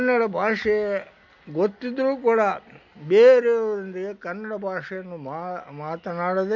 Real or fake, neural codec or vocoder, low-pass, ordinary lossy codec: real; none; 7.2 kHz; none